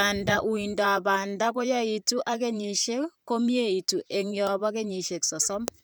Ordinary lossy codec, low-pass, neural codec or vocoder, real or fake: none; none; vocoder, 44.1 kHz, 128 mel bands, Pupu-Vocoder; fake